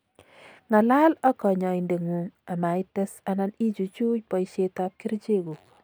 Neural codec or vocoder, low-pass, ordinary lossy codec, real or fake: none; none; none; real